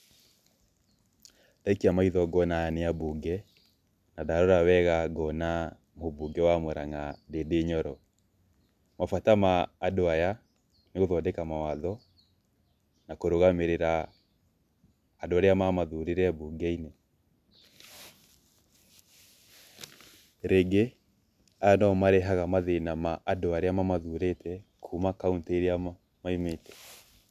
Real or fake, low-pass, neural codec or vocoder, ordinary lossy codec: real; 14.4 kHz; none; none